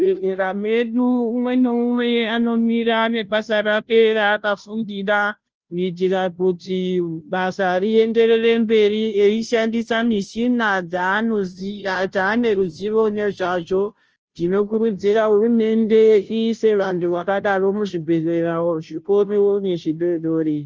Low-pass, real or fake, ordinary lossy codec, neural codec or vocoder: 7.2 kHz; fake; Opus, 24 kbps; codec, 16 kHz, 0.5 kbps, FunCodec, trained on Chinese and English, 25 frames a second